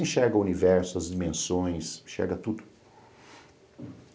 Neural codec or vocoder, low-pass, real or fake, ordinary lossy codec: none; none; real; none